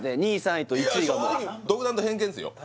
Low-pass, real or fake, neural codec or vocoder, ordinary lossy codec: none; real; none; none